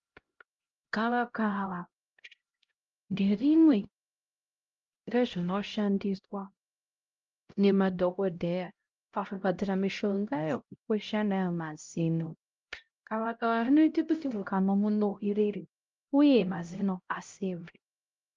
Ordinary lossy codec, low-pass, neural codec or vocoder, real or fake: Opus, 32 kbps; 7.2 kHz; codec, 16 kHz, 0.5 kbps, X-Codec, HuBERT features, trained on LibriSpeech; fake